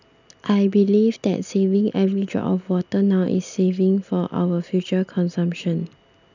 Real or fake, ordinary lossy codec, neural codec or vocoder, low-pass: real; none; none; 7.2 kHz